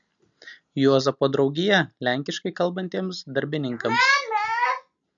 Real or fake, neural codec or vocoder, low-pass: real; none; 7.2 kHz